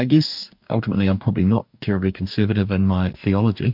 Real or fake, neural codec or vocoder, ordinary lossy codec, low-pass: fake; codec, 44.1 kHz, 2.6 kbps, DAC; MP3, 48 kbps; 5.4 kHz